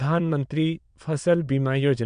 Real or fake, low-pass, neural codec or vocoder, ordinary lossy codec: fake; 9.9 kHz; autoencoder, 22.05 kHz, a latent of 192 numbers a frame, VITS, trained on many speakers; MP3, 64 kbps